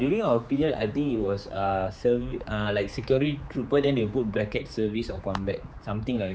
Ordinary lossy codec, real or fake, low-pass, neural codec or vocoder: none; fake; none; codec, 16 kHz, 4 kbps, X-Codec, HuBERT features, trained on general audio